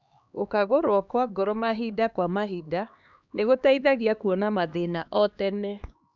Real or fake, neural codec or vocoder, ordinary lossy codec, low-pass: fake; codec, 16 kHz, 2 kbps, X-Codec, HuBERT features, trained on LibriSpeech; none; 7.2 kHz